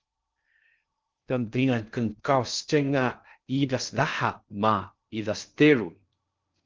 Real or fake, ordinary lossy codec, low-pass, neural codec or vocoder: fake; Opus, 24 kbps; 7.2 kHz; codec, 16 kHz in and 24 kHz out, 0.6 kbps, FocalCodec, streaming, 2048 codes